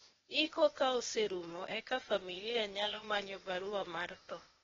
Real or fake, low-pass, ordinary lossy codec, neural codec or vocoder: fake; 7.2 kHz; AAC, 24 kbps; codec, 16 kHz, 0.8 kbps, ZipCodec